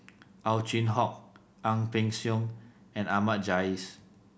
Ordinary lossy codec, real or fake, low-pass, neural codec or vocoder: none; real; none; none